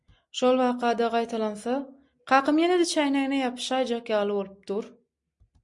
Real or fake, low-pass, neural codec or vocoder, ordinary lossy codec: real; 10.8 kHz; none; MP3, 64 kbps